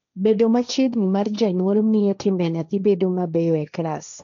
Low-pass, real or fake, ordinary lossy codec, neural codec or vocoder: 7.2 kHz; fake; none; codec, 16 kHz, 1.1 kbps, Voila-Tokenizer